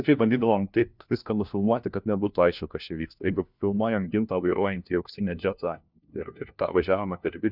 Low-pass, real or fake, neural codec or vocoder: 5.4 kHz; fake; codec, 16 kHz, 1 kbps, FunCodec, trained on LibriTTS, 50 frames a second